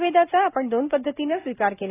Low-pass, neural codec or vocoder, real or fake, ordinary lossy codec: 3.6 kHz; none; real; AAC, 24 kbps